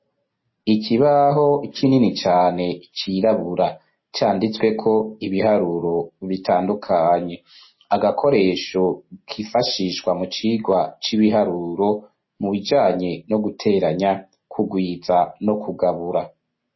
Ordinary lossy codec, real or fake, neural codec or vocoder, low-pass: MP3, 24 kbps; real; none; 7.2 kHz